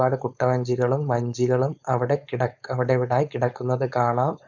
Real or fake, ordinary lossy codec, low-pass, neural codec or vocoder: fake; none; 7.2 kHz; codec, 16 kHz, 4.8 kbps, FACodec